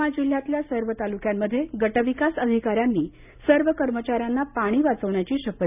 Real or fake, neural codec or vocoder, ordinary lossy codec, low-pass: real; none; none; 3.6 kHz